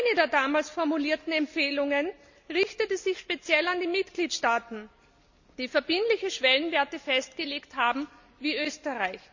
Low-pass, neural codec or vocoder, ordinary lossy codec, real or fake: 7.2 kHz; none; none; real